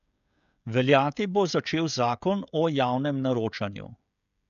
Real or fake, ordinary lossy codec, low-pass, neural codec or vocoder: fake; none; 7.2 kHz; codec, 16 kHz, 16 kbps, FreqCodec, smaller model